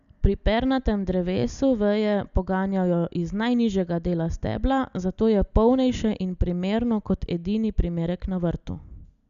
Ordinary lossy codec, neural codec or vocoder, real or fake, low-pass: none; none; real; 7.2 kHz